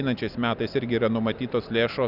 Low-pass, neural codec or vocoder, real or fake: 5.4 kHz; none; real